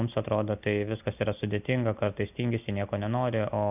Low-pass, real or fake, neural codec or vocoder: 3.6 kHz; real; none